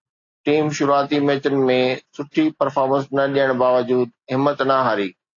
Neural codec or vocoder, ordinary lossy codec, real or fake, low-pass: none; AAC, 48 kbps; real; 7.2 kHz